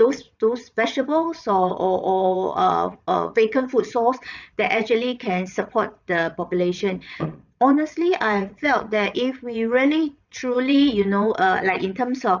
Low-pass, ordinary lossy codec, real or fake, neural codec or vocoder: 7.2 kHz; none; fake; vocoder, 22.05 kHz, 80 mel bands, WaveNeXt